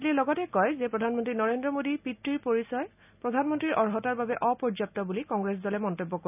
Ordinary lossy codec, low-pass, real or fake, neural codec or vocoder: none; 3.6 kHz; real; none